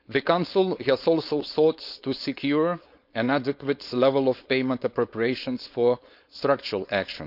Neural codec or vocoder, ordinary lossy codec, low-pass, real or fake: codec, 16 kHz, 4.8 kbps, FACodec; none; 5.4 kHz; fake